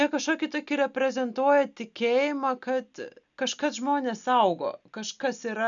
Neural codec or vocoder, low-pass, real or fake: none; 7.2 kHz; real